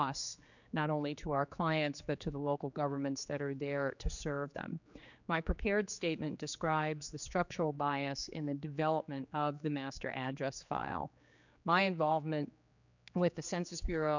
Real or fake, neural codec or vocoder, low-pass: fake; codec, 16 kHz, 4 kbps, X-Codec, HuBERT features, trained on general audio; 7.2 kHz